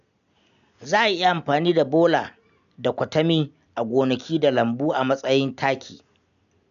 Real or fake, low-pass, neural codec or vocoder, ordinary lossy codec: real; 7.2 kHz; none; none